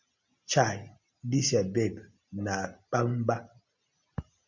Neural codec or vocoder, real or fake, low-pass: none; real; 7.2 kHz